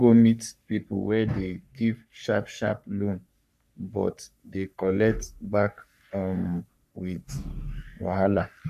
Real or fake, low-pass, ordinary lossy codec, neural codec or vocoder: fake; 14.4 kHz; none; codec, 44.1 kHz, 3.4 kbps, Pupu-Codec